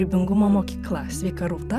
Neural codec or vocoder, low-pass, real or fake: vocoder, 44.1 kHz, 128 mel bands every 512 samples, BigVGAN v2; 14.4 kHz; fake